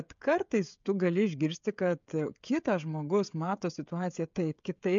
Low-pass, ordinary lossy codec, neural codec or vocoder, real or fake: 7.2 kHz; MP3, 64 kbps; codec, 16 kHz, 16 kbps, FreqCodec, smaller model; fake